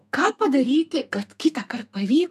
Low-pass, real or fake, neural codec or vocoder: 14.4 kHz; fake; codec, 32 kHz, 1.9 kbps, SNAC